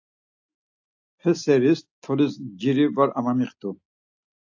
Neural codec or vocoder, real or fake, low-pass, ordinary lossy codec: none; real; 7.2 kHz; AAC, 48 kbps